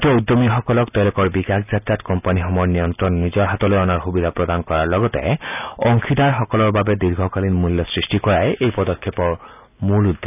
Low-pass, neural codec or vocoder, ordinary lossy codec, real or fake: 3.6 kHz; none; none; real